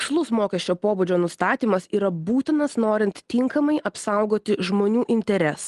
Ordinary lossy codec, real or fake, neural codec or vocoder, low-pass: Opus, 24 kbps; real; none; 10.8 kHz